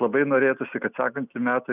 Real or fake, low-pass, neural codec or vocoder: real; 3.6 kHz; none